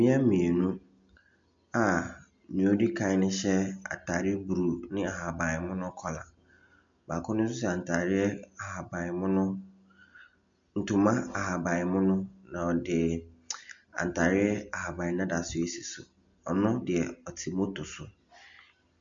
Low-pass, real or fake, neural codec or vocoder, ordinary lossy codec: 7.2 kHz; real; none; MP3, 64 kbps